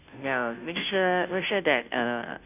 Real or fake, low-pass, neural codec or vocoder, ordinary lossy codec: fake; 3.6 kHz; codec, 16 kHz, 0.5 kbps, FunCodec, trained on Chinese and English, 25 frames a second; none